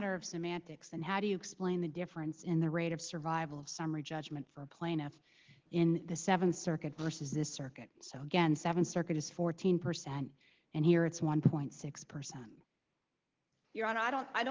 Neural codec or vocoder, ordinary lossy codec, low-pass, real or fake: none; Opus, 16 kbps; 7.2 kHz; real